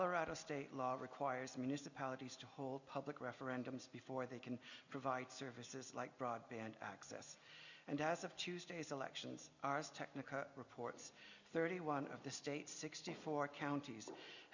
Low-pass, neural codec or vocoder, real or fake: 7.2 kHz; none; real